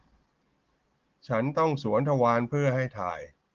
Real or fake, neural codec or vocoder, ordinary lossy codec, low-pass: real; none; Opus, 16 kbps; 7.2 kHz